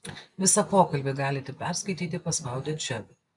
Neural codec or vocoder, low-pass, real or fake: none; 10.8 kHz; real